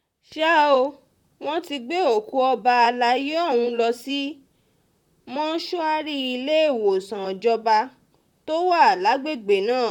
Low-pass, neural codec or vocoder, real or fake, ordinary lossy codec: 19.8 kHz; vocoder, 44.1 kHz, 128 mel bands, Pupu-Vocoder; fake; none